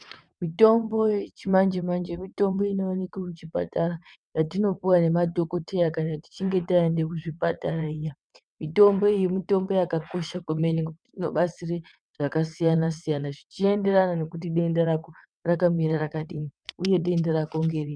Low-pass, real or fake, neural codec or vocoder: 9.9 kHz; fake; vocoder, 22.05 kHz, 80 mel bands, WaveNeXt